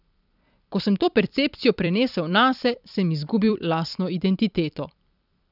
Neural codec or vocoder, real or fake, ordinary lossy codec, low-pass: vocoder, 44.1 kHz, 128 mel bands every 256 samples, BigVGAN v2; fake; none; 5.4 kHz